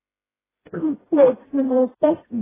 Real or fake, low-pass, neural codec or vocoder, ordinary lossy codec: fake; 3.6 kHz; codec, 16 kHz, 0.5 kbps, FreqCodec, smaller model; AAC, 16 kbps